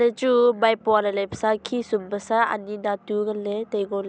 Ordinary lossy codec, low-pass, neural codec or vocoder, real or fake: none; none; none; real